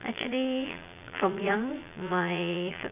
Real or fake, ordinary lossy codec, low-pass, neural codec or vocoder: fake; none; 3.6 kHz; vocoder, 22.05 kHz, 80 mel bands, Vocos